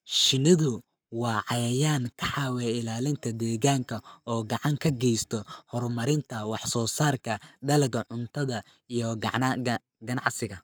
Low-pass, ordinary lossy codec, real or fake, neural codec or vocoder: none; none; fake; codec, 44.1 kHz, 7.8 kbps, Pupu-Codec